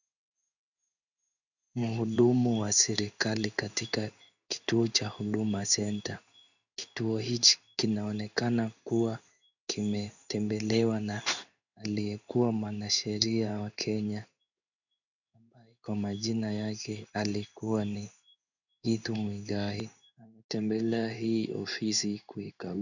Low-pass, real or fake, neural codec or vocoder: 7.2 kHz; fake; codec, 16 kHz in and 24 kHz out, 1 kbps, XY-Tokenizer